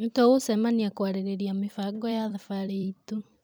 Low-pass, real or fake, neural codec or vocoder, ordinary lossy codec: none; fake; vocoder, 44.1 kHz, 128 mel bands every 256 samples, BigVGAN v2; none